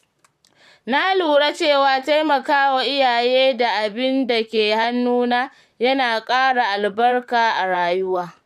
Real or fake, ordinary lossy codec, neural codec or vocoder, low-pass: fake; none; vocoder, 44.1 kHz, 128 mel bands, Pupu-Vocoder; 14.4 kHz